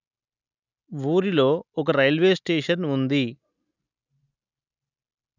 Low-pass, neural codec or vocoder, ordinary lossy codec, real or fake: 7.2 kHz; none; none; real